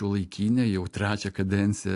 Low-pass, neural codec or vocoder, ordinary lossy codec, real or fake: 10.8 kHz; none; AAC, 64 kbps; real